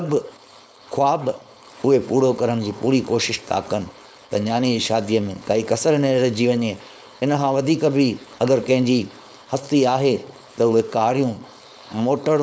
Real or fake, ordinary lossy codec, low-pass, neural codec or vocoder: fake; none; none; codec, 16 kHz, 4.8 kbps, FACodec